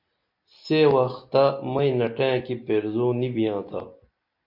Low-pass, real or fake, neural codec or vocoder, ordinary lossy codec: 5.4 kHz; real; none; MP3, 32 kbps